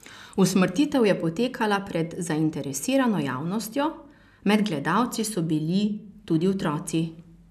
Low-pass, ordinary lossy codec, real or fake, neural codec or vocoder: 14.4 kHz; none; real; none